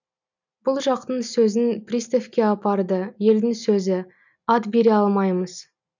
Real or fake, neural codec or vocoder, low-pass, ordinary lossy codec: real; none; 7.2 kHz; none